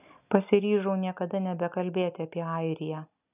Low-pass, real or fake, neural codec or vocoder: 3.6 kHz; real; none